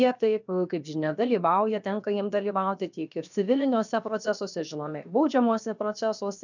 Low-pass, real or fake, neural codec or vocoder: 7.2 kHz; fake; codec, 16 kHz, 0.7 kbps, FocalCodec